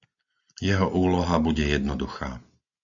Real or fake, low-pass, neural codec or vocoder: real; 7.2 kHz; none